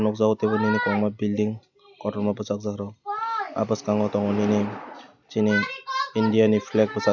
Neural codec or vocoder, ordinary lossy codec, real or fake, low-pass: none; none; real; 7.2 kHz